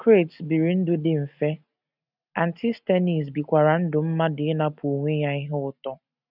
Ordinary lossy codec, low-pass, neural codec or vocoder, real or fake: none; 5.4 kHz; none; real